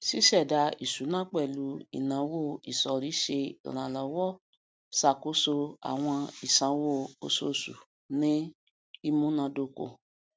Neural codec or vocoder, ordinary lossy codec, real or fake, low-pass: none; none; real; none